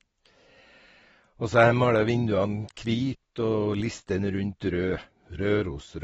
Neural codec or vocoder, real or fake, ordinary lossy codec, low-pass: none; real; AAC, 24 kbps; 19.8 kHz